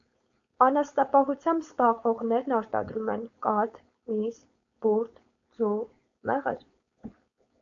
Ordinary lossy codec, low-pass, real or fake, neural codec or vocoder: AAC, 64 kbps; 7.2 kHz; fake; codec, 16 kHz, 4.8 kbps, FACodec